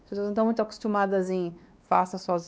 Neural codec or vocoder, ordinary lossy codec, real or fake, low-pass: codec, 16 kHz, 2 kbps, X-Codec, WavLM features, trained on Multilingual LibriSpeech; none; fake; none